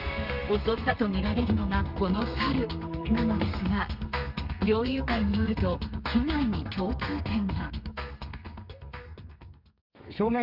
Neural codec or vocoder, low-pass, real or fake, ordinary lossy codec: codec, 32 kHz, 1.9 kbps, SNAC; 5.4 kHz; fake; none